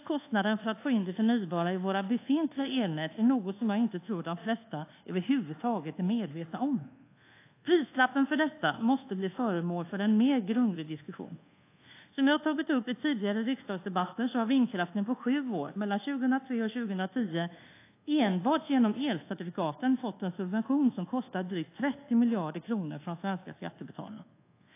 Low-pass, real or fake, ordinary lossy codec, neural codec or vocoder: 3.6 kHz; fake; AAC, 24 kbps; codec, 24 kHz, 1.2 kbps, DualCodec